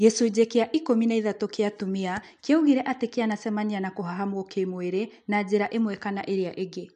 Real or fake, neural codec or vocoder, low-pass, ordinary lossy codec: real; none; 9.9 kHz; MP3, 64 kbps